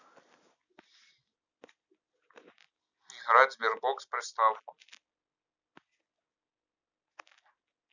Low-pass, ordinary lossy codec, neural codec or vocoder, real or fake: 7.2 kHz; none; none; real